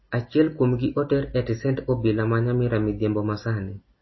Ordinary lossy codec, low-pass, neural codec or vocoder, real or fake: MP3, 24 kbps; 7.2 kHz; none; real